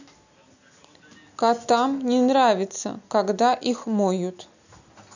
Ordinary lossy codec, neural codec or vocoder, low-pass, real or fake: none; none; 7.2 kHz; real